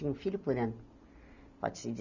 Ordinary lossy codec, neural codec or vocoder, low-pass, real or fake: none; none; 7.2 kHz; real